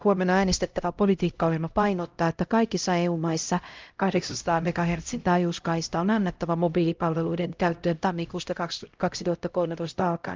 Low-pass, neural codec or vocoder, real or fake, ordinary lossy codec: 7.2 kHz; codec, 16 kHz, 0.5 kbps, X-Codec, HuBERT features, trained on LibriSpeech; fake; Opus, 24 kbps